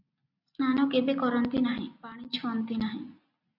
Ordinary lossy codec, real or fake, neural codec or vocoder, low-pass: AAC, 48 kbps; real; none; 5.4 kHz